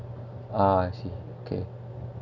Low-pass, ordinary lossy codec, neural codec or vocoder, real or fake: 7.2 kHz; none; none; real